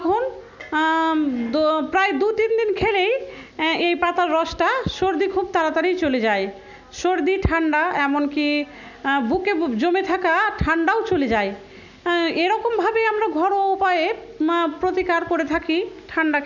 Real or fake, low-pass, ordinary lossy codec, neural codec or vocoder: real; 7.2 kHz; none; none